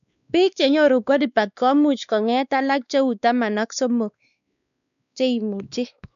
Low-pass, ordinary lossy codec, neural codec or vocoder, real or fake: 7.2 kHz; MP3, 96 kbps; codec, 16 kHz, 2 kbps, X-Codec, WavLM features, trained on Multilingual LibriSpeech; fake